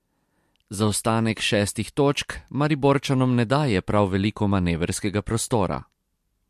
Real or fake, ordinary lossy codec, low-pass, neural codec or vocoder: fake; MP3, 64 kbps; 14.4 kHz; vocoder, 44.1 kHz, 128 mel bands every 256 samples, BigVGAN v2